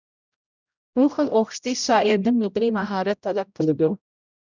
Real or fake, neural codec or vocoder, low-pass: fake; codec, 16 kHz, 0.5 kbps, X-Codec, HuBERT features, trained on general audio; 7.2 kHz